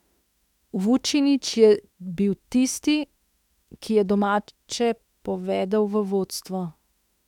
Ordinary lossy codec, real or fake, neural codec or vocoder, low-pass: none; fake; autoencoder, 48 kHz, 32 numbers a frame, DAC-VAE, trained on Japanese speech; 19.8 kHz